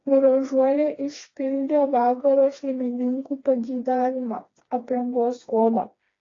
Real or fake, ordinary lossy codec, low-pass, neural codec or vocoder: fake; AAC, 32 kbps; 7.2 kHz; codec, 16 kHz, 2 kbps, FreqCodec, smaller model